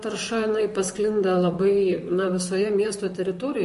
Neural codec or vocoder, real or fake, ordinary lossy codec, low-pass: vocoder, 44.1 kHz, 128 mel bands, Pupu-Vocoder; fake; MP3, 48 kbps; 14.4 kHz